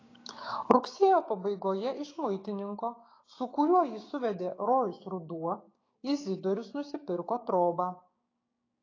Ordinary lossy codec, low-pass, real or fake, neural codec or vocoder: AAC, 32 kbps; 7.2 kHz; fake; codec, 16 kHz, 6 kbps, DAC